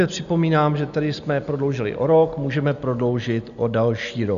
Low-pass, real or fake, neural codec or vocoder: 7.2 kHz; real; none